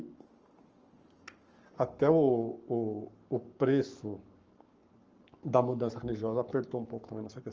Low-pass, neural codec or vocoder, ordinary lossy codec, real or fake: 7.2 kHz; codec, 44.1 kHz, 7.8 kbps, Pupu-Codec; Opus, 24 kbps; fake